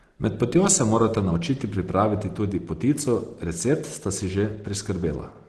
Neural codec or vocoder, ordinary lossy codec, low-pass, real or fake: none; Opus, 16 kbps; 10.8 kHz; real